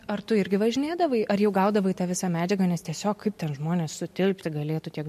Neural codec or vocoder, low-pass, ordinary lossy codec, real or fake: none; 14.4 kHz; MP3, 64 kbps; real